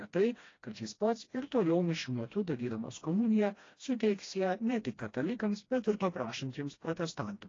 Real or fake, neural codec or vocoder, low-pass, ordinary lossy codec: fake; codec, 16 kHz, 1 kbps, FreqCodec, smaller model; 7.2 kHz; AAC, 32 kbps